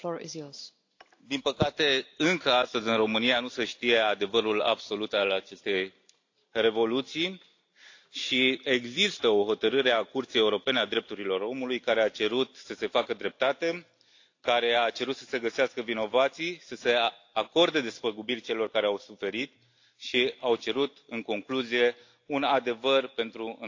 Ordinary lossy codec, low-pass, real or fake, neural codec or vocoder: AAC, 48 kbps; 7.2 kHz; real; none